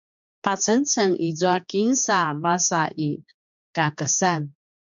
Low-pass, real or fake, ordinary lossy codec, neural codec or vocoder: 7.2 kHz; fake; MP3, 64 kbps; codec, 16 kHz, 4 kbps, X-Codec, HuBERT features, trained on general audio